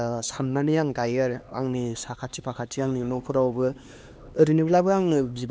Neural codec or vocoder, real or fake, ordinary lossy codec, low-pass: codec, 16 kHz, 2 kbps, X-Codec, HuBERT features, trained on LibriSpeech; fake; none; none